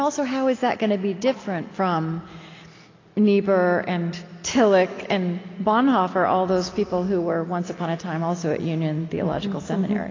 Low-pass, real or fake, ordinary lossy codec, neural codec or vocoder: 7.2 kHz; real; AAC, 32 kbps; none